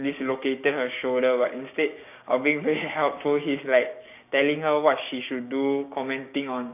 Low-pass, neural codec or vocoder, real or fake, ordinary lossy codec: 3.6 kHz; codec, 16 kHz, 6 kbps, DAC; fake; none